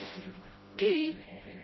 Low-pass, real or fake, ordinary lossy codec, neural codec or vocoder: 7.2 kHz; fake; MP3, 24 kbps; codec, 16 kHz, 0.5 kbps, FreqCodec, smaller model